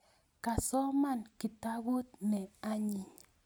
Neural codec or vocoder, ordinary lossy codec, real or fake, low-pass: none; none; real; none